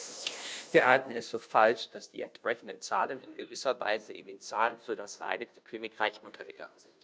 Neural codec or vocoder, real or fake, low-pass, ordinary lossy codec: codec, 16 kHz, 0.5 kbps, FunCodec, trained on Chinese and English, 25 frames a second; fake; none; none